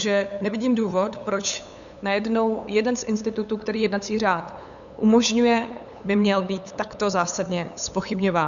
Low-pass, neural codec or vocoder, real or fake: 7.2 kHz; codec, 16 kHz, 8 kbps, FunCodec, trained on LibriTTS, 25 frames a second; fake